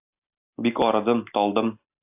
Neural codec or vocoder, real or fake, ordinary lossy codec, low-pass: none; real; AAC, 32 kbps; 3.6 kHz